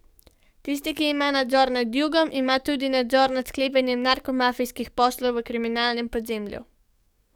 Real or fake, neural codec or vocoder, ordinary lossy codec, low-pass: fake; codec, 44.1 kHz, 7.8 kbps, DAC; none; 19.8 kHz